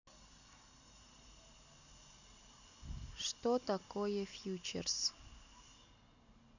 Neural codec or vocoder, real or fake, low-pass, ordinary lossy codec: none; real; 7.2 kHz; none